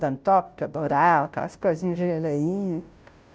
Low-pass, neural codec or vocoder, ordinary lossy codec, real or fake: none; codec, 16 kHz, 0.5 kbps, FunCodec, trained on Chinese and English, 25 frames a second; none; fake